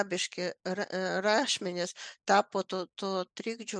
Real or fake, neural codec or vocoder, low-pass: real; none; 9.9 kHz